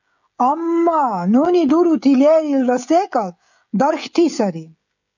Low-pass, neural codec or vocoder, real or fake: 7.2 kHz; codec, 16 kHz, 16 kbps, FreqCodec, smaller model; fake